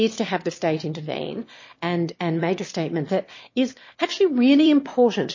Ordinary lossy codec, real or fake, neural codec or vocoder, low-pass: MP3, 32 kbps; fake; autoencoder, 22.05 kHz, a latent of 192 numbers a frame, VITS, trained on one speaker; 7.2 kHz